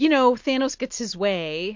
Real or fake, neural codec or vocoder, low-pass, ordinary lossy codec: real; none; 7.2 kHz; MP3, 48 kbps